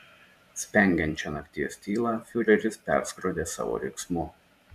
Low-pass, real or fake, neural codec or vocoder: 14.4 kHz; fake; vocoder, 44.1 kHz, 128 mel bands every 512 samples, BigVGAN v2